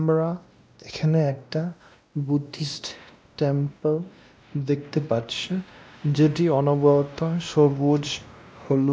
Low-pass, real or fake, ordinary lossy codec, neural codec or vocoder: none; fake; none; codec, 16 kHz, 1 kbps, X-Codec, WavLM features, trained on Multilingual LibriSpeech